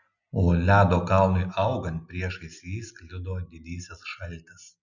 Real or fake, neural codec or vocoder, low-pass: real; none; 7.2 kHz